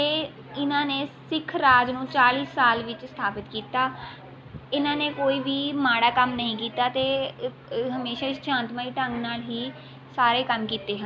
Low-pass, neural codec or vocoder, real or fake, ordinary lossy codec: none; none; real; none